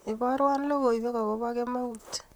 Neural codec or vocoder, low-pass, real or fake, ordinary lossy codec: vocoder, 44.1 kHz, 128 mel bands, Pupu-Vocoder; none; fake; none